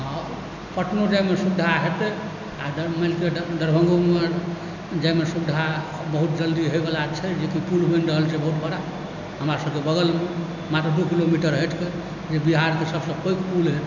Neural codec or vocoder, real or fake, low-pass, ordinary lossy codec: none; real; 7.2 kHz; none